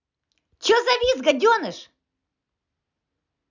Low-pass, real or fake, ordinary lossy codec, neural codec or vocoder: 7.2 kHz; real; none; none